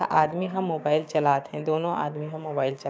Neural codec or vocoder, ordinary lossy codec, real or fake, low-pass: codec, 16 kHz, 6 kbps, DAC; none; fake; none